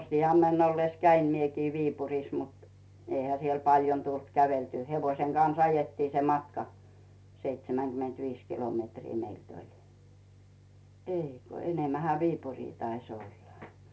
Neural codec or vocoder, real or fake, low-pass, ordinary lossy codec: none; real; none; none